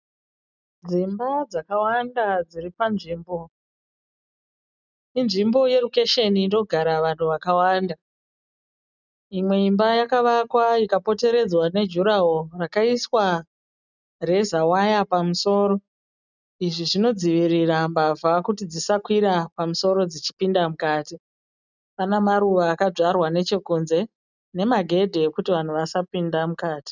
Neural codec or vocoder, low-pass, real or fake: none; 7.2 kHz; real